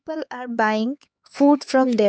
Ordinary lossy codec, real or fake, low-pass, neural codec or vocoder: none; fake; none; codec, 16 kHz, 4 kbps, X-Codec, HuBERT features, trained on LibriSpeech